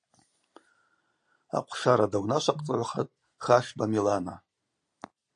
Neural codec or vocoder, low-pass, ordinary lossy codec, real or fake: none; 9.9 kHz; AAC, 64 kbps; real